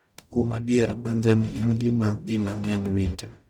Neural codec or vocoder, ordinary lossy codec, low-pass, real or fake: codec, 44.1 kHz, 0.9 kbps, DAC; none; 19.8 kHz; fake